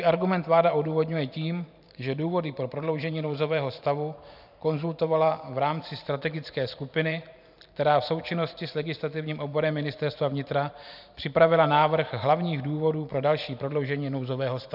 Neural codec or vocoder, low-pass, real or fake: vocoder, 44.1 kHz, 128 mel bands every 512 samples, BigVGAN v2; 5.4 kHz; fake